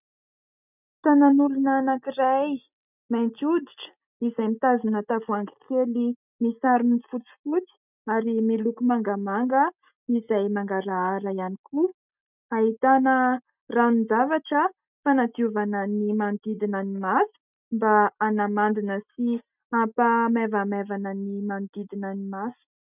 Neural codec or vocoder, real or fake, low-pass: none; real; 3.6 kHz